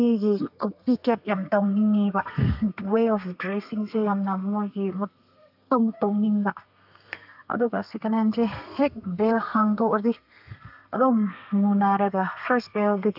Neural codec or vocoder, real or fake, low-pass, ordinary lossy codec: codec, 32 kHz, 1.9 kbps, SNAC; fake; 5.4 kHz; AAC, 48 kbps